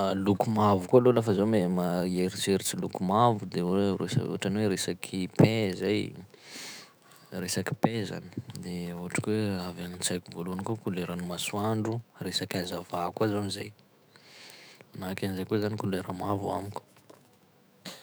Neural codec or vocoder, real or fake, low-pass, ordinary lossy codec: autoencoder, 48 kHz, 128 numbers a frame, DAC-VAE, trained on Japanese speech; fake; none; none